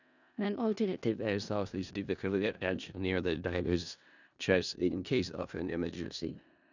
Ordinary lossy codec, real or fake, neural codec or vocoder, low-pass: none; fake; codec, 16 kHz in and 24 kHz out, 0.4 kbps, LongCat-Audio-Codec, four codebook decoder; 7.2 kHz